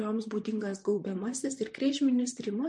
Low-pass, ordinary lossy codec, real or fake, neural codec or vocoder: 10.8 kHz; MP3, 48 kbps; fake; vocoder, 44.1 kHz, 128 mel bands, Pupu-Vocoder